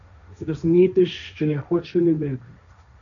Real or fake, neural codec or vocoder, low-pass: fake; codec, 16 kHz, 1.1 kbps, Voila-Tokenizer; 7.2 kHz